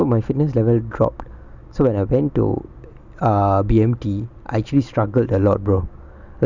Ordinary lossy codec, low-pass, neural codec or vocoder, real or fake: none; 7.2 kHz; none; real